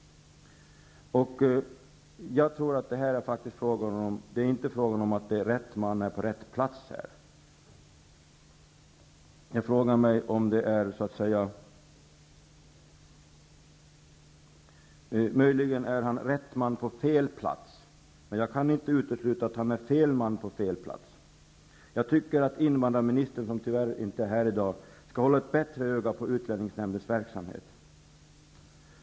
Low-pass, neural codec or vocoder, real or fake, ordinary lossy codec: none; none; real; none